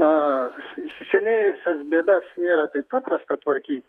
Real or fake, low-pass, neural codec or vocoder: fake; 14.4 kHz; codec, 32 kHz, 1.9 kbps, SNAC